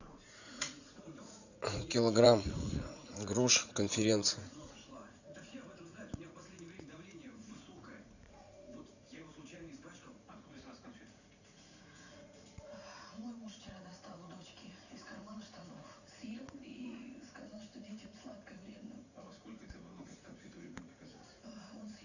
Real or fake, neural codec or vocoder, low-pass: fake; vocoder, 44.1 kHz, 80 mel bands, Vocos; 7.2 kHz